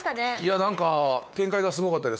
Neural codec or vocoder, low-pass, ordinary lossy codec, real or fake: codec, 16 kHz, 4 kbps, X-Codec, WavLM features, trained on Multilingual LibriSpeech; none; none; fake